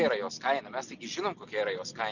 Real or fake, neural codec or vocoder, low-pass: real; none; 7.2 kHz